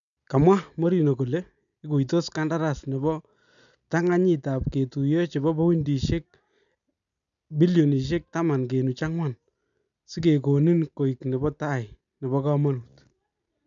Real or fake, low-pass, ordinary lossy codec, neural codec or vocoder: real; 7.2 kHz; none; none